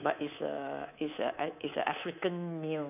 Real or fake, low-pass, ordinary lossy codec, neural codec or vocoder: fake; 3.6 kHz; none; codec, 24 kHz, 3.1 kbps, DualCodec